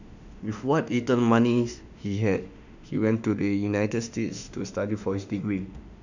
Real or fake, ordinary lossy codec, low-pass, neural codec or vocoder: fake; none; 7.2 kHz; autoencoder, 48 kHz, 32 numbers a frame, DAC-VAE, trained on Japanese speech